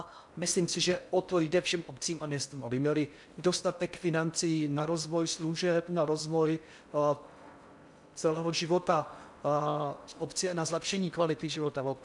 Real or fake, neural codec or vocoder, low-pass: fake; codec, 16 kHz in and 24 kHz out, 0.6 kbps, FocalCodec, streaming, 4096 codes; 10.8 kHz